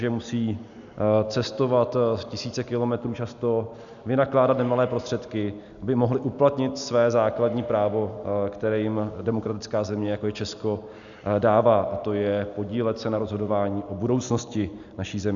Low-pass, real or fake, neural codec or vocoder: 7.2 kHz; real; none